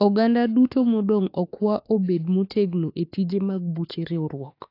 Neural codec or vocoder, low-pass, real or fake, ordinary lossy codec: autoencoder, 48 kHz, 32 numbers a frame, DAC-VAE, trained on Japanese speech; 5.4 kHz; fake; AAC, 32 kbps